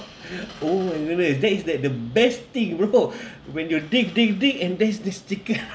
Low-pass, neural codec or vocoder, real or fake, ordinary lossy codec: none; none; real; none